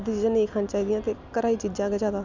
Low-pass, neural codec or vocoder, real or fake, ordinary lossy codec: 7.2 kHz; none; real; none